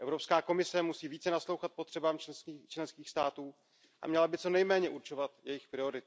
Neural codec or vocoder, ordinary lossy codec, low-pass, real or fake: none; none; none; real